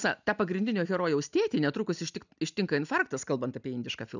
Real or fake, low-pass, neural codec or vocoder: real; 7.2 kHz; none